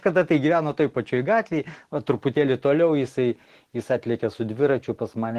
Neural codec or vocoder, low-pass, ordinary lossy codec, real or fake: none; 14.4 kHz; Opus, 16 kbps; real